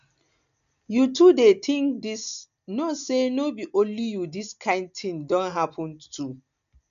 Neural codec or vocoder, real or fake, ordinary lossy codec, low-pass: none; real; none; 7.2 kHz